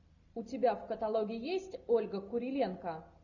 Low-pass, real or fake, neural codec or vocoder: 7.2 kHz; real; none